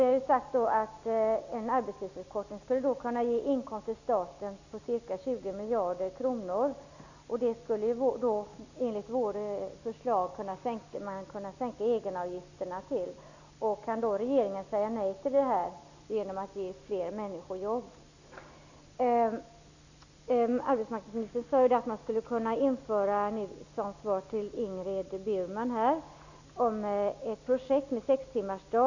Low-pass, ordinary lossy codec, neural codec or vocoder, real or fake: 7.2 kHz; none; none; real